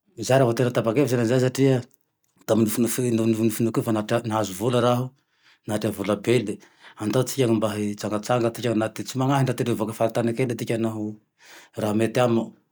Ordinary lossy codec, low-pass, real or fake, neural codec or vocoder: none; none; real; none